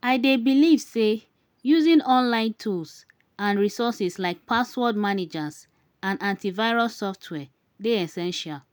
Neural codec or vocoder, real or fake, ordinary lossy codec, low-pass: none; real; none; none